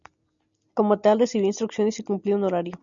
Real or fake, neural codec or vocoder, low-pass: real; none; 7.2 kHz